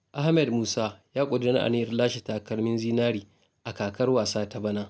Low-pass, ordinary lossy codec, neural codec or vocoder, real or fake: none; none; none; real